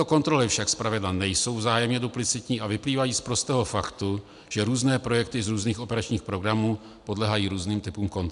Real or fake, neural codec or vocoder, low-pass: real; none; 10.8 kHz